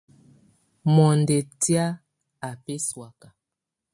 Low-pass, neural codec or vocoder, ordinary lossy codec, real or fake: 10.8 kHz; none; MP3, 64 kbps; real